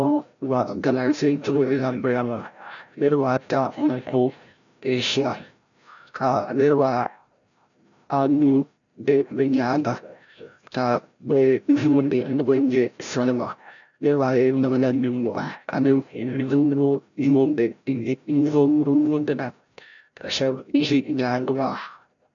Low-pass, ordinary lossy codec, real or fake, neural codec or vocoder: 7.2 kHz; MP3, 96 kbps; fake; codec, 16 kHz, 0.5 kbps, FreqCodec, larger model